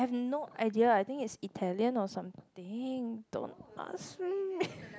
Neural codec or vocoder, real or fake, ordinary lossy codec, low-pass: none; real; none; none